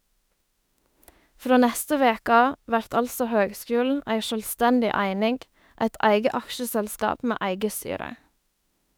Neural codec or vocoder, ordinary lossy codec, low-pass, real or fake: autoencoder, 48 kHz, 32 numbers a frame, DAC-VAE, trained on Japanese speech; none; none; fake